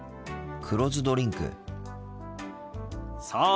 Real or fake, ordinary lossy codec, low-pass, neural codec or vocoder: real; none; none; none